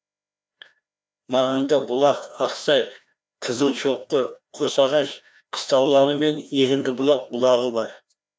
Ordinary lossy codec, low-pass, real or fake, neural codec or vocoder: none; none; fake; codec, 16 kHz, 1 kbps, FreqCodec, larger model